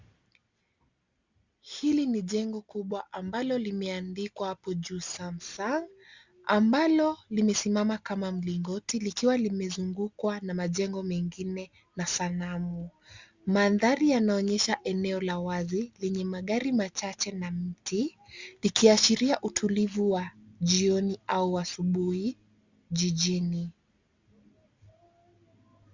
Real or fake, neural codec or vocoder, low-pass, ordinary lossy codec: real; none; 7.2 kHz; Opus, 64 kbps